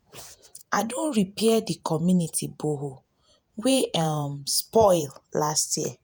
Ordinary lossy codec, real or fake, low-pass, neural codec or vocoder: none; fake; none; vocoder, 48 kHz, 128 mel bands, Vocos